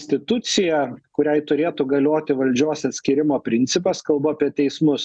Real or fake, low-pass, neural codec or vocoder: real; 9.9 kHz; none